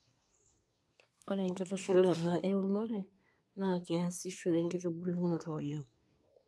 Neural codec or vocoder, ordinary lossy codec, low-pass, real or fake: codec, 24 kHz, 1 kbps, SNAC; none; none; fake